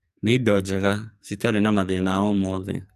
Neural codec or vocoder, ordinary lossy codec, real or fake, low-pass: codec, 44.1 kHz, 2.6 kbps, SNAC; AAC, 96 kbps; fake; 14.4 kHz